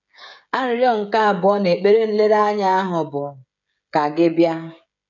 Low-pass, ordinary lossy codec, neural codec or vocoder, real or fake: 7.2 kHz; none; codec, 16 kHz, 16 kbps, FreqCodec, smaller model; fake